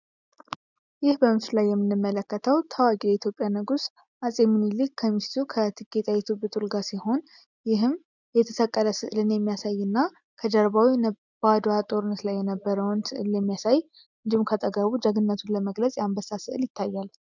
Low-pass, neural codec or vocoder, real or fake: 7.2 kHz; none; real